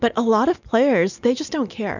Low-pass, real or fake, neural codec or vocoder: 7.2 kHz; real; none